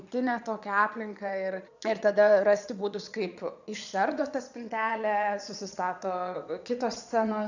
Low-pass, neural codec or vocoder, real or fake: 7.2 kHz; vocoder, 22.05 kHz, 80 mel bands, Vocos; fake